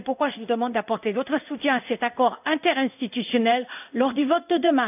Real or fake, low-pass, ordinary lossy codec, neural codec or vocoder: fake; 3.6 kHz; none; codec, 16 kHz in and 24 kHz out, 1 kbps, XY-Tokenizer